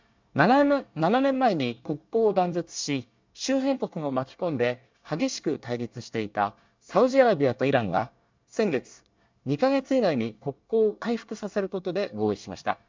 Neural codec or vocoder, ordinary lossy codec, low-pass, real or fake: codec, 24 kHz, 1 kbps, SNAC; MP3, 64 kbps; 7.2 kHz; fake